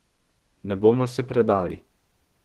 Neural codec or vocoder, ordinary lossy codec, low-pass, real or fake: codec, 32 kHz, 1.9 kbps, SNAC; Opus, 16 kbps; 14.4 kHz; fake